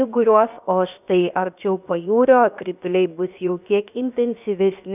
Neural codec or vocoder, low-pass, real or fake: codec, 16 kHz, 0.7 kbps, FocalCodec; 3.6 kHz; fake